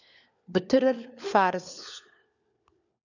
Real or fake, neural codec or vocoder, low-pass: fake; codec, 16 kHz, 4 kbps, FunCodec, trained on LibriTTS, 50 frames a second; 7.2 kHz